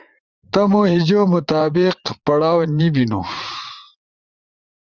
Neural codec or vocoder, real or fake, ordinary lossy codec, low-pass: vocoder, 44.1 kHz, 80 mel bands, Vocos; fake; Opus, 32 kbps; 7.2 kHz